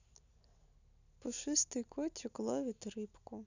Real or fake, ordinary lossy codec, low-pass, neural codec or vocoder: real; none; 7.2 kHz; none